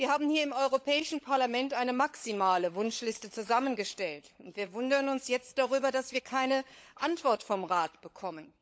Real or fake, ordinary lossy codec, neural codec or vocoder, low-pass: fake; none; codec, 16 kHz, 16 kbps, FunCodec, trained on LibriTTS, 50 frames a second; none